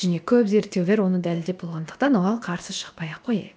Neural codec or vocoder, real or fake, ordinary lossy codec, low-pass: codec, 16 kHz, about 1 kbps, DyCAST, with the encoder's durations; fake; none; none